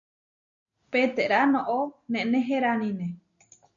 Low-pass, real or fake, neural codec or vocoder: 7.2 kHz; real; none